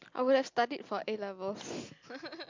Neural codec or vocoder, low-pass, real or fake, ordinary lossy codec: none; 7.2 kHz; real; MP3, 64 kbps